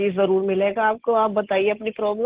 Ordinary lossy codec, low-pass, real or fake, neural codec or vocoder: Opus, 16 kbps; 3.6 kHz; real; none